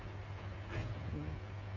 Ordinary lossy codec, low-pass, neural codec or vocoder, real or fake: MP3, 48 kbps; 7.2 kHz; codec, 16 kHz, 8 kbps, FreqCodec, smaller model; fake